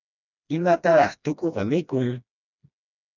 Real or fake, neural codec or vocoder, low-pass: fake; codec, 16 kHz, 1 kbps, FreqCodec, smaller model; 7.2 kHz